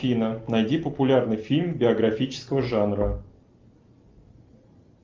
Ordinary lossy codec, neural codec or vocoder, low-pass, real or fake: Opus, 32 kbps; none; 7.2 kHz; real